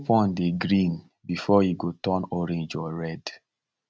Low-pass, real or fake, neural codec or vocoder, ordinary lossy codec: none; real; none; none